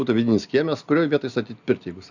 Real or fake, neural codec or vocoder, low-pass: real; none; 7.2 kHz